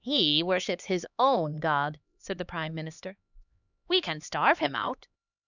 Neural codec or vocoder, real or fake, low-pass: codec, 16 kHz, 2 kbps, X-Codec, HuBERT features, trained on LibriSpeech; fake; 7.2 kHz